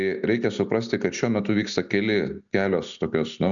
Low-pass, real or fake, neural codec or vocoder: 7.2 kHz; real; none